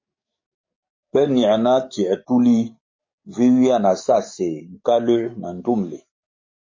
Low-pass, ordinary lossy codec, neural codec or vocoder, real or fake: 7.2 kHz; MP3, 32 kbps; codec, 44.1 kHz, 7.8 kbps, DAC; fake